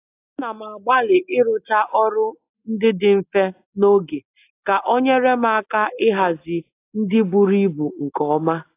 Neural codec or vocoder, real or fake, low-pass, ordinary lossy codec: none; real; 3.6 kHz; AAC, 24 kbps